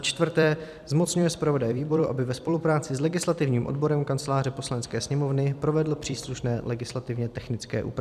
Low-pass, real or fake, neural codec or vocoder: 14.4 kHz; fake; vocoder, 44.1 kHz, 128 mel bands every 256 samples, BigVGAN v2